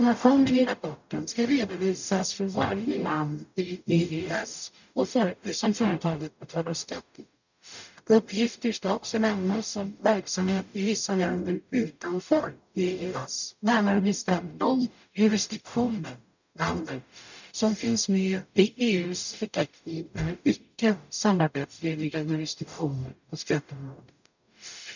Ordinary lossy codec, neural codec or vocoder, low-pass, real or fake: none; codec, 44.1 kHz, 0.9 kbps, DAC; 7.2 kHz; fake